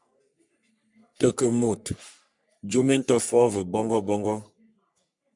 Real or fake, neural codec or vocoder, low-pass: fake; codec, 44.1 kHz, 3.4 kbps, Pupu-Codec; 10.8 kHz